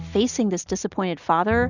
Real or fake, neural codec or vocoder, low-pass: real; none; 7.2 kHz